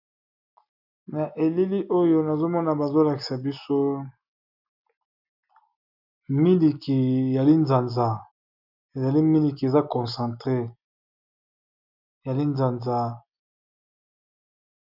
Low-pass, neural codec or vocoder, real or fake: 5.4 kHz; none; real